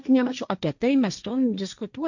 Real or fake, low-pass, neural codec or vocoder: fake; 7.2 kHz; codec, 16 kHz, 1.1 kbps, Voila-Tokenizer